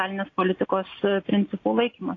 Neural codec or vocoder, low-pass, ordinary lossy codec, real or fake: none; 7.2 kHz; AAC, 32 kbps; real